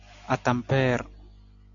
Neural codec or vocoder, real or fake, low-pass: none; real; 7.2 kHz